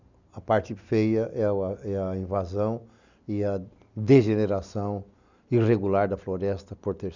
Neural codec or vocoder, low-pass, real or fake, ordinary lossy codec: none; 7.2 kHz; real; none